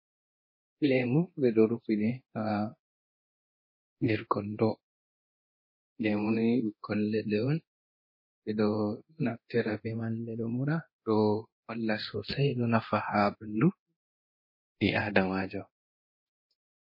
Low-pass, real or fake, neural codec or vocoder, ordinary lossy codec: 5.4 kHz; fake; codec, 24 kHz, 0.9 kbps, DualCodec; MP3, 24 kbps